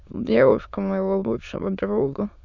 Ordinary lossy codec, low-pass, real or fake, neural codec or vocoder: none; 7.2 kHz; fake; autoencoder, 22.05 kHz, a latent of 192 numbers a frame, VITS, trained on many speakers